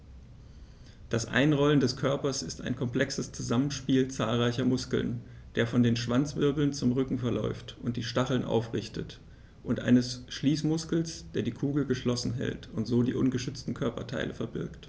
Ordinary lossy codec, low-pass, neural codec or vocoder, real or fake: none; none; none; real